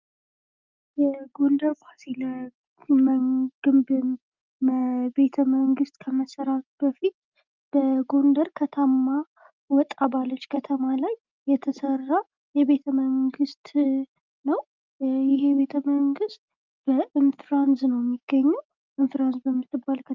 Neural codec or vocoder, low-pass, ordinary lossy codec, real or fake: none; 7.2 kHz; Opus, 24 kbps; real